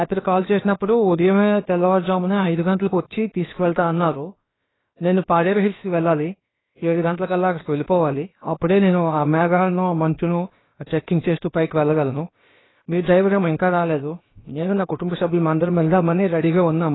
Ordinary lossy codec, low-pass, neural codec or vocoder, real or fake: AAC, 16 kbps; 7.2 kHz; codec, 16 kHz, about 1 kbps, DyCAST, with the encoder's durations; fake